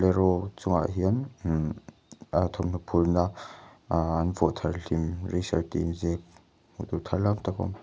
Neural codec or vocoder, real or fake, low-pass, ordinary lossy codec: none; real; none; none